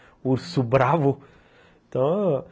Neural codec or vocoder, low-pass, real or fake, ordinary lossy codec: none; none; real; none